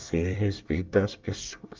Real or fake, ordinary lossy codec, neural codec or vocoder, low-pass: fake; Opus, 32 kbps; codec, 24 kHz, 1 kbps, SNAC; 7.2 kHz